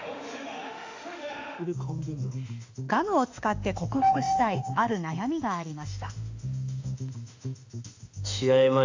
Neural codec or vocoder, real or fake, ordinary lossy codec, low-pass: autoencoder, 48 kHz, 32 numbers a frame, DAC-VAE, trained on Japanese speech; fake; AAC, 48 kbps; 7.2 kHz